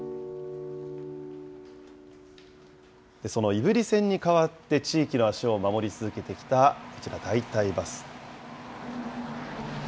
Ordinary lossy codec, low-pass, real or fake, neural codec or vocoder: none; none; real; none